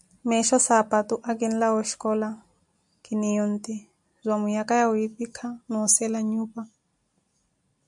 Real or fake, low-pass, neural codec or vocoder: real; 10.8 kHz; none